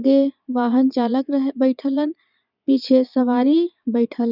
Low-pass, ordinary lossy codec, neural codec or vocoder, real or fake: 5.4 kHz; none; vocoder, 22.05 kHz, 80 mel bands, Vocos; fake